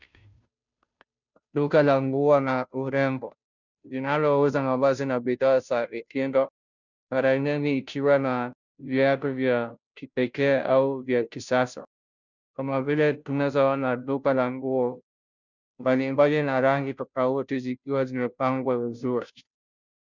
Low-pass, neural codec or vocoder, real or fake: 7.2 kHz; codec, 16 kHz, 0.5 kbps, FunCodec, trained on Chinese and English, 25 frames a second; fake